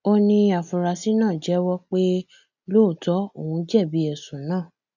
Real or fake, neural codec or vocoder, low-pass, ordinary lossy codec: real; none; 7.2 kHz; none